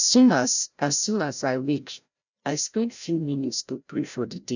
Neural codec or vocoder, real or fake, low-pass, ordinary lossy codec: codec, 16 kHz, 0.5 kbps, FreqCodec, larger model; fake; 7.2 kHz; none